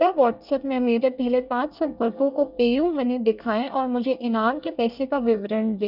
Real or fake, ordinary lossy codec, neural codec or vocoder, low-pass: fake; none; codec, 24 kHz, 1 kbps, SNAC; 5.4 kHz